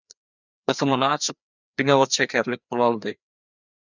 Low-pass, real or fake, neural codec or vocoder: 7.2 kHz; fake; codec, 16 kHz, 2 kbps, FreqCodec, larger model